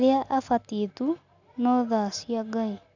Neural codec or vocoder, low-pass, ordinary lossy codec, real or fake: none; 7.2 kHz; none; real